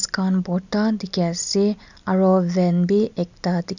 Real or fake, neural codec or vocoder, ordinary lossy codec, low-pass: real; none; none; 7.2 kHz